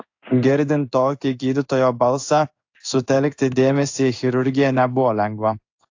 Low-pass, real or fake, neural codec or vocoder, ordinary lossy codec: 7.2 kHz; fake; codec, 16 kHz in and 24 kHz out, 1 kbps, XY-Tokenizer; AAC, 48 kbps